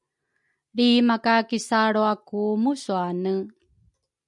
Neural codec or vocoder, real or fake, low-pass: none; real; 10.8 kHz